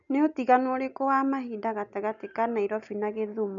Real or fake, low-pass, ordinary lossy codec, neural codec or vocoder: real; 7.2 kHz; none; none